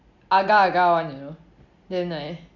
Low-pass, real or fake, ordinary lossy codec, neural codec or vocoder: 7.2 kHz; real; none; none